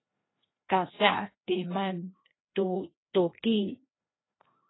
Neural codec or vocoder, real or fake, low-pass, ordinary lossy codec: codec, 16 kHz, 1 kbps, FreqCodec, larger model; fake; 7.2 kHz; AAC, 16 kbps